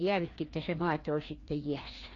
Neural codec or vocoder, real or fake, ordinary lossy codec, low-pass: codec, 16 kHz, 2 kbps, FreqCodec, larger model; fake; AAC, 32 kbps; 7.2 kHz